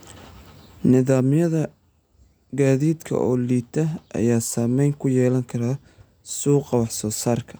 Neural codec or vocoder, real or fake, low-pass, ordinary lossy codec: none; real; none; none